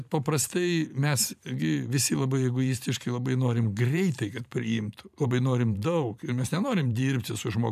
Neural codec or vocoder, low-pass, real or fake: vocoder, 44.1 kHz, 128 mel bands every 512 samples, BigVGAN v2; 14.4 kHz; fake